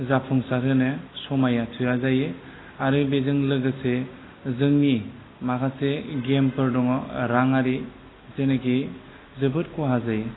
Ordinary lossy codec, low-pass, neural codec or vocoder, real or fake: AAC, 16 kbps; 7.2 kHz; none; real